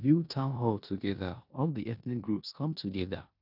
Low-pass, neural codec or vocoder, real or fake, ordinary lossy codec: 5.4 kHz; codec, 16 kHz in and 24 kHz out, 0.9 kbps, LongCat-Audio-Codec, four codebook decoder; fake; none